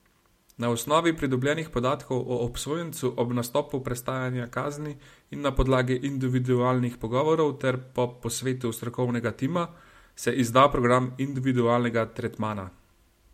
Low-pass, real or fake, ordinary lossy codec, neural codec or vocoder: 19.8 kHz; real; MP3, 64 kbps; none